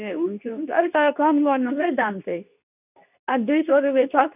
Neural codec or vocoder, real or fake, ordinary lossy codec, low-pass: codec, 24 kHz, 0.9 kbps, WavTokenizer, medium speech release version 2; fake; none; 3.6 kHz